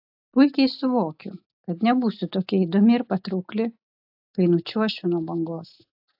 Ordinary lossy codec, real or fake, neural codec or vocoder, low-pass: AAC, 48 kbps; real; none; 5.4 kHz